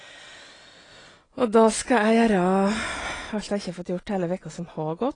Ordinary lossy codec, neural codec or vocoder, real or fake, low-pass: AAC, 32 kbps; none; real; 9.9 kHz